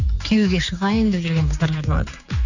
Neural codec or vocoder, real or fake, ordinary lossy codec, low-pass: codec, 16 kHz, 4 kbps, X-Codec, HuBERT features, trained on general audio; fake; none; 7.2 kHz